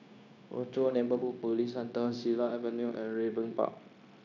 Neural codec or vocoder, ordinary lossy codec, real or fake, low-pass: codec, 16 kHz, 0.9 kbps, LongCat-Audio-Codec; none; fake; 7.2 kHz